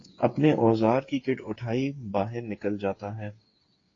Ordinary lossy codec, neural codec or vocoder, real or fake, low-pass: AAC, 32 kbps; codec, 16 kHz, 8 kbps, FreqCodec, smaller model; fake; 7.2 kHz